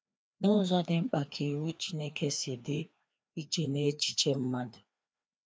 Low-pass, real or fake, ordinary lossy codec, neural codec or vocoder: none; fake; none; codec, 16 kHz, 2 kbps, FreqCodec, larger model